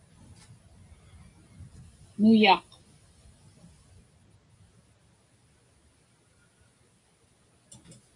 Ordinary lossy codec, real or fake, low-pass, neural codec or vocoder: AAC, 48 kbps; real; 10.8 kHz; none